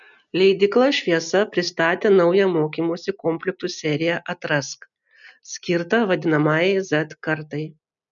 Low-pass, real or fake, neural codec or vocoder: 7.2 kHz; real; none